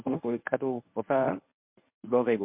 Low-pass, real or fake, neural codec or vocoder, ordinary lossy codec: 3.6 kHz; fake; codec, 24 kHz, 0.9 kbps, WavTokenizer, medium speech release version 1; MP3, 32 kbps